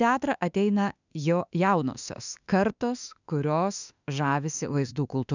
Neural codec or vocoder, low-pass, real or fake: autoencoder, 48 kHz, 32 numbers a frame, DAC-VAE, trained on Japanese speech; 7.2 kHz; fake